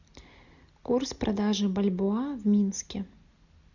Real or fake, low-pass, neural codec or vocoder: real; 7.2 kHz; none